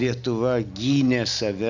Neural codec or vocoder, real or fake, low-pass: none; real; 7.2 kHz